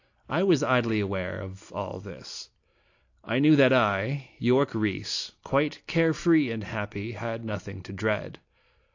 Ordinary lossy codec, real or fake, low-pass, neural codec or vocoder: AAC, 48 kbps; real; 7.2 kHz; none